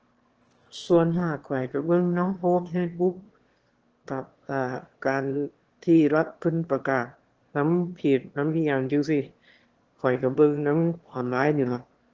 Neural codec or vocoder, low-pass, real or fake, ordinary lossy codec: autoencoder, 22.05 kHz, a latent of 192 numbers a frame, VITS, trained on one speaker; 7.2 kHz; fake; Opus, 16 kbps